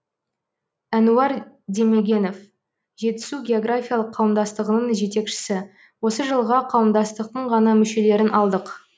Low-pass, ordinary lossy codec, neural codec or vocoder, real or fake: none; none; none; real